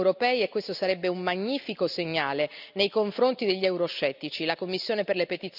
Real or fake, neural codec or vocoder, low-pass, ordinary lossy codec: real; none; 5.4 kHz; none